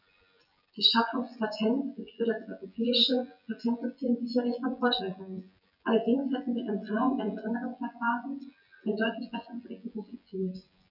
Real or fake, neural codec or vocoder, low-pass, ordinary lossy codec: fake; vocoder, 24 kHz, 100 mel bands, Vocos; 5.4 kHz; none